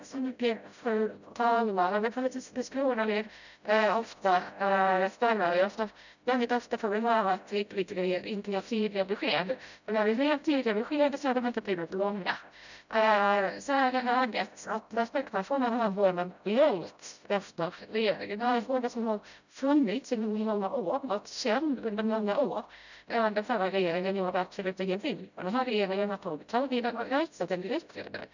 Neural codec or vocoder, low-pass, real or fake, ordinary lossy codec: codec, 16 kHz, 0.5 kbps, FreqCodec, smaller model; 7.2 kHz; fake; none